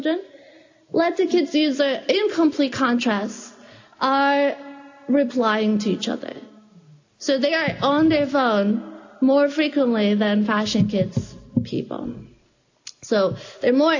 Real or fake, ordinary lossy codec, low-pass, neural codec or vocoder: real; AAC, 48 kbps; 7.2 kHz; none